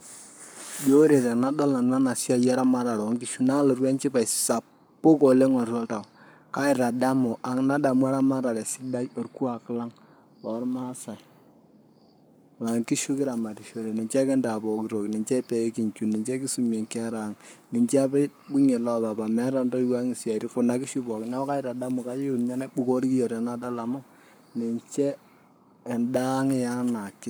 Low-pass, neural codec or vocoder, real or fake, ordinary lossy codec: none; codec, 44.1 kHz, 7.8 kbps, Pupu-Codec; fake; none